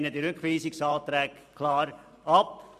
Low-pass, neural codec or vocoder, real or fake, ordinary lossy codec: 14.4 kHz; vocoder, 44.1 kHz, 128 mel bands every 512 samples, BigVGAN v2; fake; none